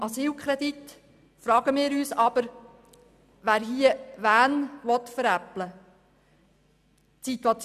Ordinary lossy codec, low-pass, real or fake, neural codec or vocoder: none; 14.4 kHz; real; none